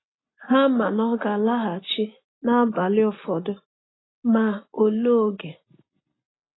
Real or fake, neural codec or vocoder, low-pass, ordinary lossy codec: real; none; 7.2 kHz; AAC, 16 kbps